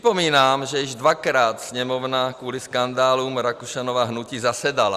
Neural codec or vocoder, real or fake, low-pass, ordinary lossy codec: none; real; 14.4 kHz; Opus, 64 kbps